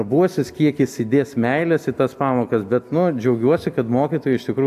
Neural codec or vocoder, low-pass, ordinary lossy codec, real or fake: none; 14.4 kHz; AAC, 96 kbps; real